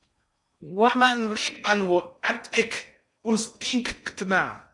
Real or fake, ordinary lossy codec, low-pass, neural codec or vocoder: fake; MP3, 96 kbps; 10.8 kHz; codec, 16 kHz in and 24 kHz out, 0.6 kbps, FocalCodec, streaming, 4096 codes